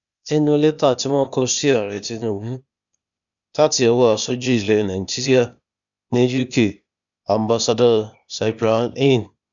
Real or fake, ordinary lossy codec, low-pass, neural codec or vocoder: fake; none; 7.2 kHz; codec, 16 kHz, 0.8 kbps, ZipCodec